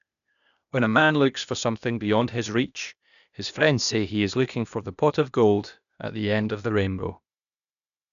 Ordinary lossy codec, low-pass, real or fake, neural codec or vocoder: none; 7.2 kHz; fake; codec, 16 kHz, 0.8 kbps, ZipCodec